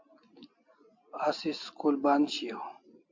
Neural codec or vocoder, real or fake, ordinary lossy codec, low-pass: none; real; AAC, 48 kbps; 7.2 kHz